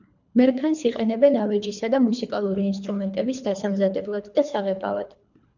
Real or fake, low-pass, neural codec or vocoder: fake; 7.2 kHz; codec, 24 kHz, 3 kbps, HILCodec